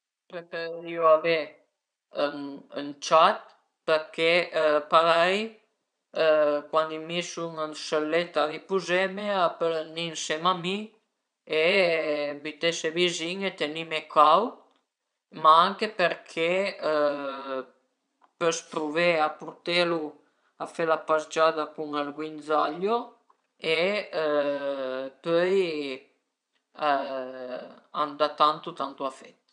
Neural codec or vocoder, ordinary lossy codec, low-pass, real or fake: vocoder, 22.05 kHz, 80 mel bands, Vocos; none; 9.9 kHz; fake